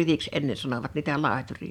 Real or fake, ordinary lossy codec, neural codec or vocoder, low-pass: fake; none; vocoder, 44.1 kHz, 128 mel bands every 512 samples, BigVGAN v2; 19.8 kHz